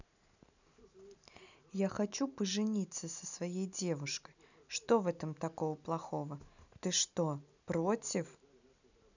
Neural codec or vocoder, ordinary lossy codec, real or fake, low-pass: none; none; real; 7.2 kHz